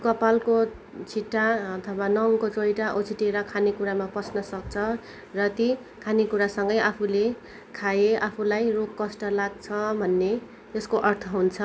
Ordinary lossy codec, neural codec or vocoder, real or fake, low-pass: none; none; real; none